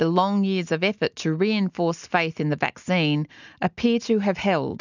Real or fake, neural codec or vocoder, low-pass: real; none; 7.2 kHz